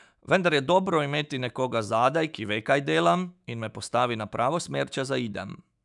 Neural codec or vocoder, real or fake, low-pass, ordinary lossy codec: autoencoder, 48 kHz, 128 numbers a frame, DAC-VAE, trained on Japanese speech; fake; 10.8 kHz; none